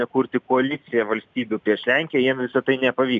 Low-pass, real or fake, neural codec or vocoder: 7.2 kHz; real; none